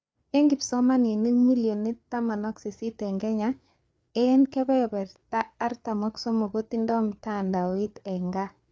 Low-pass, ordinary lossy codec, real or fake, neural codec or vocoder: none; none; fake; codec, 16 kHz, 4 kbps, FreqCodec, larger model